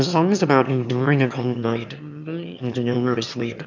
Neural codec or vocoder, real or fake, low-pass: autoencoder, 22.05 kHz, a latent of 192 numbers a frame, VITS, trained on one speaker; fake; 7.2 kHz